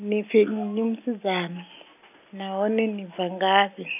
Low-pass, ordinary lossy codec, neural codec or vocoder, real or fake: 3.6 kHz; none; none; real